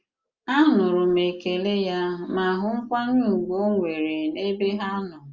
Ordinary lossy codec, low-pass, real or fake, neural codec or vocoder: Opus, 32 kbps; 7.2 kHz; real; none